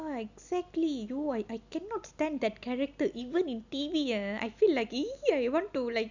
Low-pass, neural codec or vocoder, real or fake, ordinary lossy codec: 7.2 kHz; none; real; none